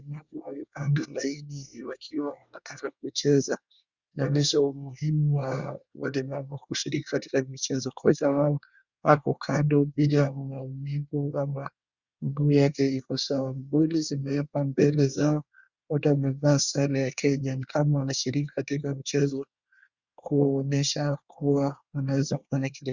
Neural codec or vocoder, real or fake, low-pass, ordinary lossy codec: codec, 24 kHz, 1 kbps, SNAC; fake; 7.2 kHz; Opus, 64 kbps